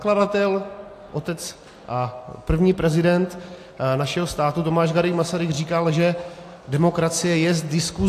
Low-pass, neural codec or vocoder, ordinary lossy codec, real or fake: 14.4 kHz; none; AAC, 64 kbps; real